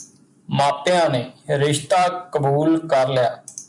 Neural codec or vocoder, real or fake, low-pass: none; real; 10.8 kHz